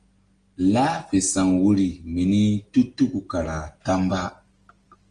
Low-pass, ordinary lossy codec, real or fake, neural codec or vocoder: 9.9 kHz; Opus, 24 kbps; real; none